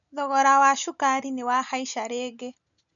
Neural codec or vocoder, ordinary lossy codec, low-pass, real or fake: none; none; 7.2 kHz; real